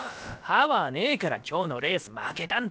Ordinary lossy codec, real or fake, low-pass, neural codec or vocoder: none; fake; none; codec, 16 kHz, about 1 kbps, DyCAST, with the encoder's durations